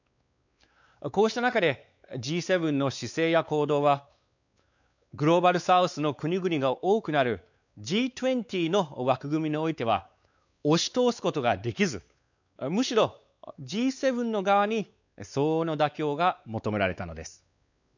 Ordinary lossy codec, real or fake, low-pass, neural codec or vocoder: none; fake; 7.2 kHz; codec, 16 kHz, 4 kbps, X-Codec, WavLM features, trained on Multilingual LibriSpeech